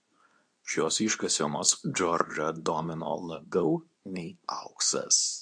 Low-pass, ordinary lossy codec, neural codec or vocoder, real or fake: 9.9 kHz; AAC, 64 kbps; codec, 24 kHz, 0.9 kbps, WavTokenizer, medium speech release version 2; fake